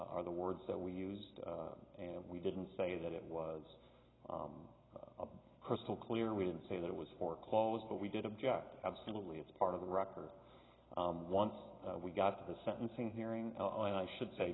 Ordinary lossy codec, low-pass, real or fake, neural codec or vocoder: AAC, 16 kbps; 7.2 kHz; real; none